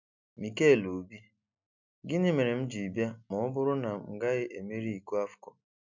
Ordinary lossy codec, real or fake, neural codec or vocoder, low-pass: none; real; none; 7.2 kHz